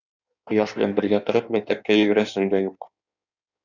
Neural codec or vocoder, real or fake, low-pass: codec, 16 kHz in and 24 kHz out, 1.1 kbps, FireRedTTS-2 codec; fake; 7.2 kHz